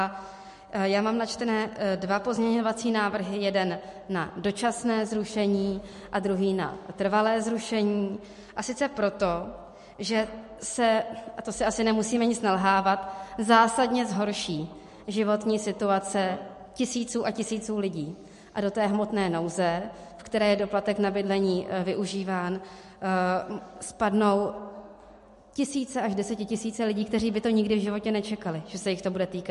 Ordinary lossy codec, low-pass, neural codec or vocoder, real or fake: MP3, 48 kbps; 14.4 kHz; none; real